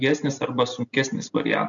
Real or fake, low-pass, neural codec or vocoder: real; 7.2 kHz; none